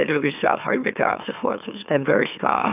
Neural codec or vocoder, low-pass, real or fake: autoencoder, 44.1 kHz, a latent of 192 numbers a frame, MeloTTS; 3.6 kHz; fake